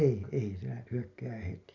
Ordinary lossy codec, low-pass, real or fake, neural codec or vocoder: none; 7.2 kHz; real; none